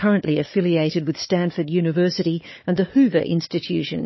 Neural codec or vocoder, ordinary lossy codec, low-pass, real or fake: codec, 16 kHz, 4 kbps, FunCodec, trained on Chinese and English, 50 frames a second; MP3, 24 kbps; 7.2 kHz; fake